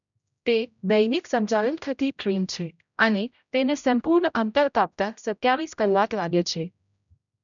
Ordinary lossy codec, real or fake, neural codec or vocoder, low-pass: none; fake; codec, 16 kHz, 0.5 kbps, X-Codec, HuBERT features, trained on general audio; 7.2 kHz